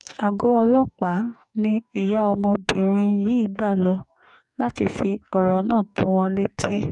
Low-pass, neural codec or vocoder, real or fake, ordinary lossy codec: 10.8 kHz; codec, 44.1 kHz, 2.6 kbps, DAC; fake; none